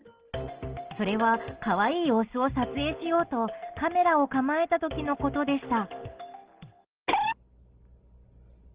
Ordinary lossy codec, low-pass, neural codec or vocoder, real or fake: Opus, 16 kbps; 3.6 kHz; none; real